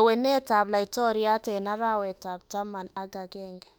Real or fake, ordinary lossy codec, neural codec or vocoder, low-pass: fake; none; autoencoder, 48 kHz, 32 numbers a frame, DAC-VAE, trained on Japanese speech; 19.8 kHz